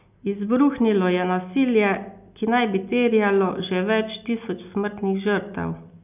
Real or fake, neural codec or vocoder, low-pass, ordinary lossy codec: real; none; 3.6 kHz; none